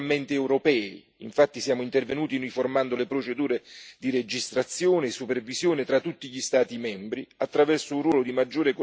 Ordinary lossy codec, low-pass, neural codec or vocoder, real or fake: none; none; none; real